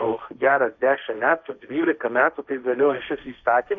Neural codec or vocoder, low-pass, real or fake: codec, 16 kHz, 1.1 kbps, Voila-Tokenizer; 7.2 kHz; fake